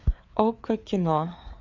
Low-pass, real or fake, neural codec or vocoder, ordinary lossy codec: 7.2 kHz; fake; codec, 16 kHz, 4 kbps, FreqCodec, larger model; MP3, 64 kbps